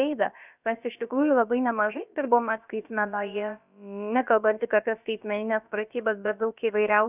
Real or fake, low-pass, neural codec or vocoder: fake; 3.6 kHz; codec, 16 kHz, about 1 kbps, DyCAST, with the encoder's durations